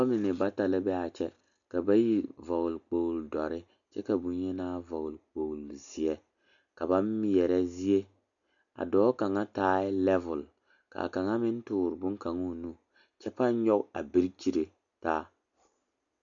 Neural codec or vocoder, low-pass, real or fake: none; 7.2 kHz; real